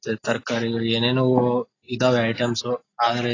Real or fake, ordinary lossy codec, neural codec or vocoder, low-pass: real; AAC, 32 kbps; none; 7.2 kHz